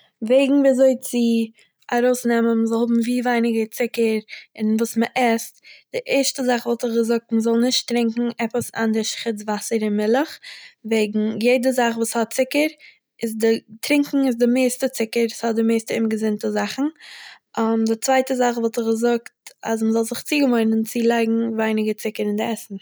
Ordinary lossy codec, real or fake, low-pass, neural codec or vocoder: none; real; none; none